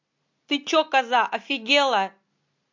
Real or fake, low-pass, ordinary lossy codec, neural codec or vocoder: real; 7.2 kHz; MP3, 48 kbps; none